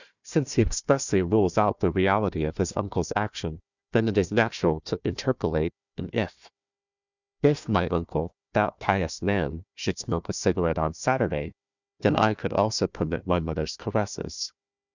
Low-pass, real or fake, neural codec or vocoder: 7.2 kHz; fake; codec, 16 kHz, 1 kbps, FunCodec, trained on Chinese and English, 50 frames a second